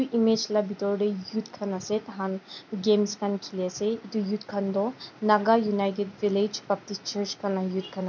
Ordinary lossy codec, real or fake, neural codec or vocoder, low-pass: none; real; none; 7.2 kHz